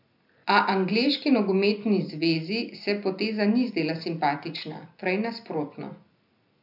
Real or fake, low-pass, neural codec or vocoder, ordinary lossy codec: real; 5.4 kHz; none; none